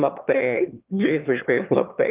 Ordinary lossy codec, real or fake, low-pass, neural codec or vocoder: Opus, 24 kbps; fake; 3.6 kHz; autoencoder, 22.05 kHz, a latent of 192 numbers a frame, VITS, trained on one speaker